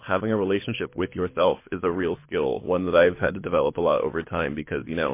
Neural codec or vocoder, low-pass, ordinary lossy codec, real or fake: codec, 24 kHz, 6 kbps, HILCodec; 3.6 kHz; MP3, 24 kbps; fake